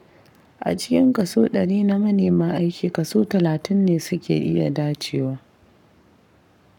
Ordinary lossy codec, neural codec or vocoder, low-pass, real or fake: none; codec, 44.1 kHz, 7.8 kbps, Pupu-Codec; 19.8 kHz; fake